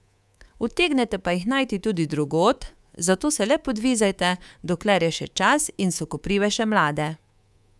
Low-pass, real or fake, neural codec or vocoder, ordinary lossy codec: none; fake; codec, 24 kHz, 3.1 kbps, DualCodec; none